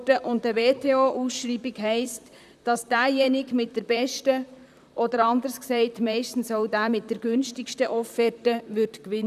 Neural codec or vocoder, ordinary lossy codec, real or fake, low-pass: vocoder, 44.1 kHz, 128 mel bands, Pupu-Vocoder; none; fake; 14.4 kHz